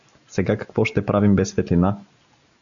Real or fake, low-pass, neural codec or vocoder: real; 7.2 kHz; none